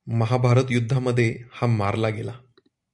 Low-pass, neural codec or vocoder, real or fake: 10.8 kHz; none; real